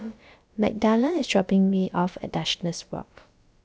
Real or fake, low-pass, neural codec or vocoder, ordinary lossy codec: fake; none; codec, 16 kHz, about 1 kbps, DyCAST, with the encoder's durations; none